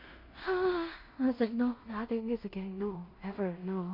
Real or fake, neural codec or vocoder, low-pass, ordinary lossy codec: fake; codec, 16 kHz in and 24 kHz out, 0.4 kbps, LongCat-Audio-Codec, two codebook decoder; 5.4 kHz; MP3, 32 kbps